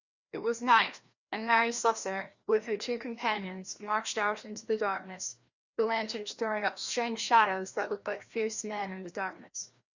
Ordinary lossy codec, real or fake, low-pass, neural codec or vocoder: Opus, 64 kbps; fake; 7.2 kHz; codec, 16 kHz, 1 kbps, FreqCodec, larger model